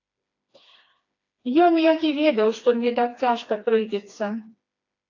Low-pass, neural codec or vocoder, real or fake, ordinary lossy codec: 7.2 kHz; codec, 16 kHz, 2 kbps, FreqCodec, smaller model; fake; AAC, 48 kbps